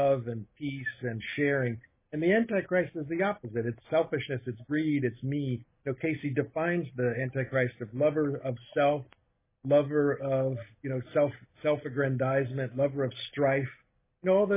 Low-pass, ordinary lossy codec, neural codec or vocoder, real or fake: 3.6 kHz; MP3, 16 kbps; none; real